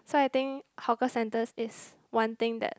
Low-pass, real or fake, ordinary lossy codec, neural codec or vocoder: none; real; none; none